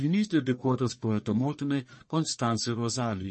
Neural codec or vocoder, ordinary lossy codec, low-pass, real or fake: codec, 44.1 kHz, 1.7 kbps, Pupu-Codec; MP3, 32 kbps; 10.8 kHz; fake